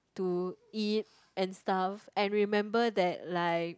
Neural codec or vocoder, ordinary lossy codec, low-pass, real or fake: none; none; none; real